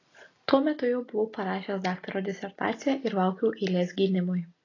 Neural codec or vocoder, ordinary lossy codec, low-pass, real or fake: none; AAC, 32 kbps; 7.2 kHz; real